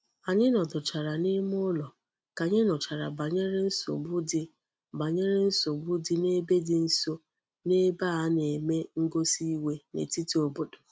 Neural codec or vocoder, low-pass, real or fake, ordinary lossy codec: none; none; real; none